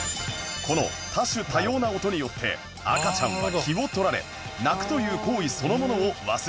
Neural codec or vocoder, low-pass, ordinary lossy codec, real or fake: none; none; none; real